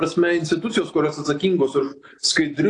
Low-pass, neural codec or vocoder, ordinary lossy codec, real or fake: 10.8 kHz; none; AAC, 48 kbps; real